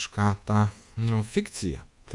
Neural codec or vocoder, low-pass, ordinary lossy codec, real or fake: codec, 24 kHz, 1.2 kbps, DualCodec; 10.8 kHz; Opus, 64 kbps; fake